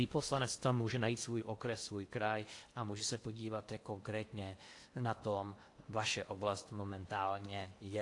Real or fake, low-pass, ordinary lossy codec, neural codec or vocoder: fake; 10.8 kHz; AAC, 48 kbps; codec, 16 kHz in and 24 kHz out, 0.8 kbps, FocalCodec, streaming, 65536 codes